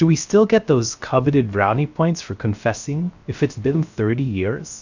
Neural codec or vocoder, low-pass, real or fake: codec, 16 kHz, 0.3 kbps, FocalCodec; 7.2 kHz; fake